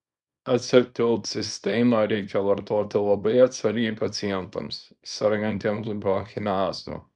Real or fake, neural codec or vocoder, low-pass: fake; codec, 24 kHz, 0.9 kbps, WavTokenizer, small release; 10.8 kHz